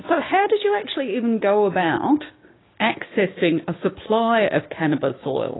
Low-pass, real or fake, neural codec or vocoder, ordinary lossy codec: 7.2 kHz; fake; codec, 16 kHz, 6 kbps, DAC; AAC, 16 kbps